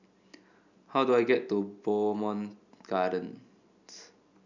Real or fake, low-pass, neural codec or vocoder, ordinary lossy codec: real; 7.2 kHz; none; none